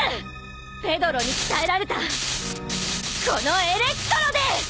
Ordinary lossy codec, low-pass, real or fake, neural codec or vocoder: none; none; real; none